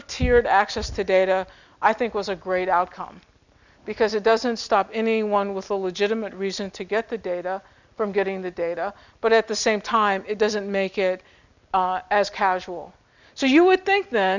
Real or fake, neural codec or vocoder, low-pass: real; none; 7.2 kHz